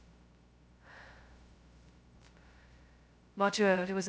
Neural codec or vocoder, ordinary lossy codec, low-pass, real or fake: codec, 16 kHz, 0.2 kbps, FocalCodec; none; none; fake